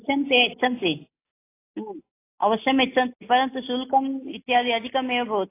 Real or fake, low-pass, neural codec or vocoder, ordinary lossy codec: real; 3.6 kHz; none; AAC, 24 kbps